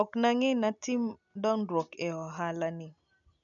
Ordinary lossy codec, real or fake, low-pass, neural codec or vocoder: none; real; 7.2 kHz; none